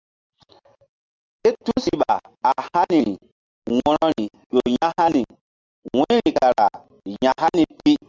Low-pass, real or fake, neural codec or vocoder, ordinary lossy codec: 7.2 kHz; real; none; Opus, 32 kbps